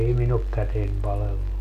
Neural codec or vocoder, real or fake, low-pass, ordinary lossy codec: none; real; 14.4 kHz; none